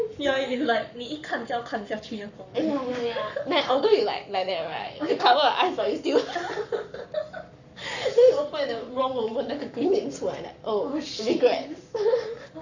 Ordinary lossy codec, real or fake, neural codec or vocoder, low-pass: none; fake; codec, 44.1 kHz, 7.8 kbps, Pupu-Codec; 7.2 kHz